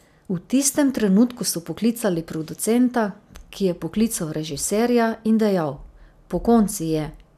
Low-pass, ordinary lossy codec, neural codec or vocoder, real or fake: 14.4 kHz; none; none; real